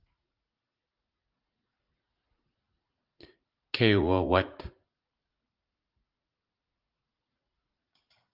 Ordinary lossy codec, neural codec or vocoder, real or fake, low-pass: Opus, 32 kbps; vocoder, 44.1 kHz, 80 mel bands, Vocos; fake; 5.4 kHz